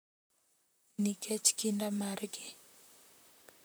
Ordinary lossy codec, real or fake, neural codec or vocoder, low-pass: none; fake; vocoder, 44.1 kHz, 128 mel bands, Pupu-Vocoder; none